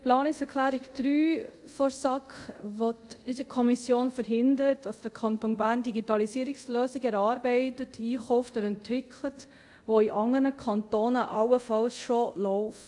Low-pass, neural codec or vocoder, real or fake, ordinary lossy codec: 10.8 kHz; codec, 24 kHz, 0.5 kbps, DualCodec; fake; AAC, 64 kbps